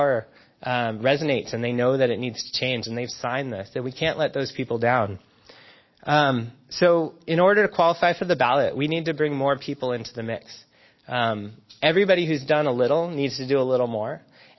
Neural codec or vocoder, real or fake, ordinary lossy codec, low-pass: none; real; MP3, 24 kbps; 7.2 kHz